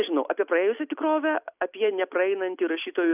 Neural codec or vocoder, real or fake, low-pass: none; real; 3.6 kHz